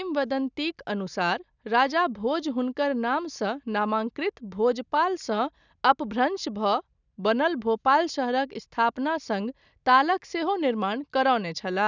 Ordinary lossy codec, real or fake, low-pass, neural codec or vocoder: none; real; 7.2 kHz; none